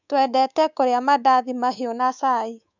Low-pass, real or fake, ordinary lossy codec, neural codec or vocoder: 7.2 kHz; fake; none; codec, 24 kHz, 3.1 kbps, DualCodec